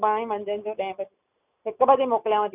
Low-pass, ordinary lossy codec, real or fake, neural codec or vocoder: 3.6 kHz; none; real; none